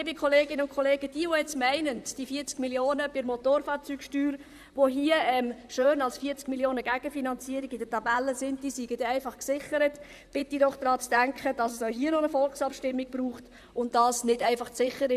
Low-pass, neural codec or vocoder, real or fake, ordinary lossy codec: 14.4 kHz; vocoder, 44.1 kHz, 128 mel bands, Pupu-Vocoder; fake; none